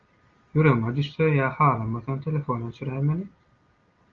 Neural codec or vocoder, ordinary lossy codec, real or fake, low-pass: none; Opus, 32 kbps; real; 7.2 kHz